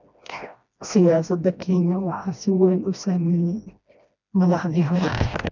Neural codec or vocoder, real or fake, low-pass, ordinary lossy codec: codec, 16 kHz, 1 kbps, FreqCodec, smaller model; fake; 7.2 kHz; none